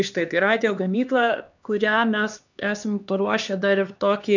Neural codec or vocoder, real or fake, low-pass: codec, 16 kHz, 2 kbps, X-Codec, HuBERT features, trained on LibriSpeech; fake; 7.2 kHz